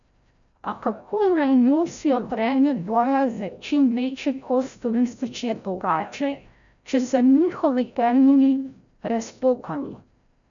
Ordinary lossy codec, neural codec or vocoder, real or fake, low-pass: none; codec, 16 kHz, 0.5 kbps, FreqCodec, larger model; fake; 7.2 kHz